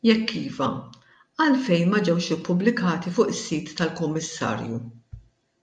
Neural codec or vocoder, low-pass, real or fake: none; 9.9 kHz; real